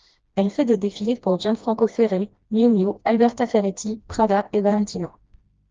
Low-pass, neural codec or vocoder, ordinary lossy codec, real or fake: 7.2 kHz; codec, 16 kHz, 1 kbps, FreqCodec, smaller model; Opus, 24 kbps; fake